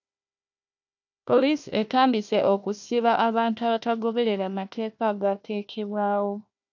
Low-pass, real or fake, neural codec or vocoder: 7.2 kHz; fake; codec, 16 kHz, 1 kbps, FunCodec, trained on Chinese and English, 50 frames a second